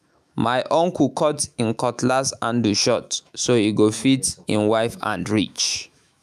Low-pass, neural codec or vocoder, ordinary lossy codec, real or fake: 14.4 kHz; autoencoder, 48 kHz, 128 numbers a frame, DAC-VAE, trained on Japanese speech; none; fake